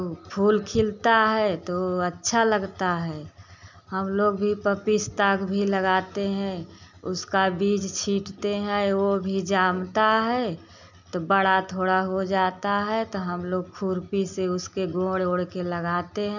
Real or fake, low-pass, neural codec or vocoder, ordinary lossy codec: real; 7.2 kHz; none; none